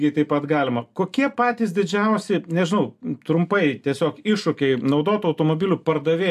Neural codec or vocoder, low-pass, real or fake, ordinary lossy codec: none; 14.4 kHz; real; AAC, 96 kbps